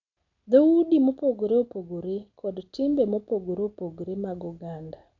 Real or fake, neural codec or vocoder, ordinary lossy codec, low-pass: real; none; none; 7.2 kHz